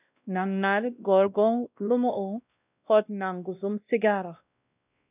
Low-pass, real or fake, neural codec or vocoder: 3.6 kHz; fake; codec, 16 kHz, 1 kbps, X-Codec, WavLM features, trained on Multilingual LibriSpeech